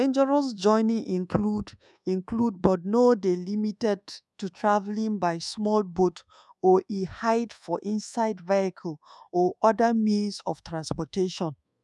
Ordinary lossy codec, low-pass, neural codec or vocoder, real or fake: none; none; codec, 24 kHz, 1.2 kbps, DualCodec; fake